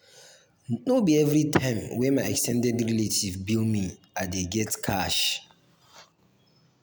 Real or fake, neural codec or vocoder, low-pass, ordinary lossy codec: real; none; none; none